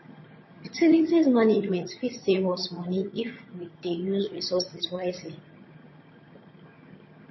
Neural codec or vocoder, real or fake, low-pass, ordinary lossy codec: vocoder, 22.05 kHz, 80 mel bands, HiFi-GAN; fake; 7.2 kHz; MP3, 24 kbps